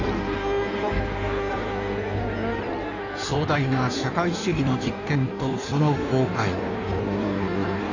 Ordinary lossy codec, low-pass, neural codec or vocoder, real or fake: none; 7.2 kHz; codec, 16 kHz in and 24 kHz out, 1.1 kbps, FireRedTTS-2 codec; fake